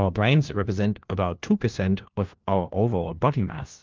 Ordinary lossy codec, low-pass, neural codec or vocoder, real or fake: Opus, 32 kbps; 7.2 kHz; codec, 16 kHz, 1 kbps, FunCodec, trained on LibriTTS, 50 frames a second; fake